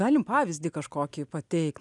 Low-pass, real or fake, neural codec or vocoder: 10.8 kHz; real; none